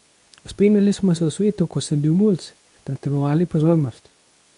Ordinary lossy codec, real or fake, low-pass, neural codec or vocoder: none; fake; 10.8 kHz; codec, 24 kHz, 0.9 kbps, WavTokenizer, medium speech release version 2